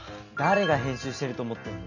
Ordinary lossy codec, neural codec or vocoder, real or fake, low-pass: none; none; real; 7.2 kHz